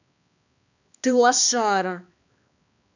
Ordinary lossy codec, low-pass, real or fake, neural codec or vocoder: none; 7.2 kHz; fake; codec, 16 kHz, 2 kbps, X-Codec, HuBERT features, trained on balanced general audio